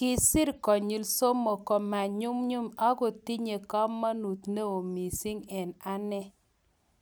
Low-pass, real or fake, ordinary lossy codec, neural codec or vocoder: none; real; none; none